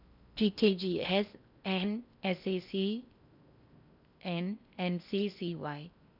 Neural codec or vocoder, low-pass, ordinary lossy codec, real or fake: codec, 16 kHz in and 24 kHz out, 0.6 kbps, FocalCodec, streaming, 4096 codes; 5.4 kHz; none; fake